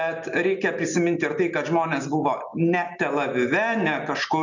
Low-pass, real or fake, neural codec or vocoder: 7.2 kHz; real; none